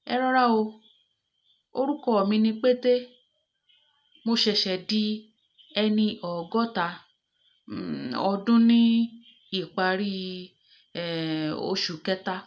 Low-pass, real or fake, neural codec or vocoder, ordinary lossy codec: none; real; none; none